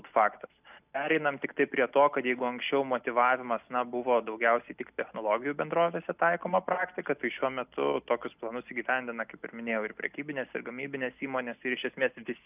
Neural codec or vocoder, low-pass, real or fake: none; 3.6 kHz; real